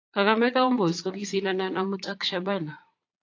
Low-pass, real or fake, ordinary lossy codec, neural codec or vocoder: 7.2 kHz; fake; AAC, 48 kbps; vocoder, 22.05 kHz, 80 mel bands, Vocos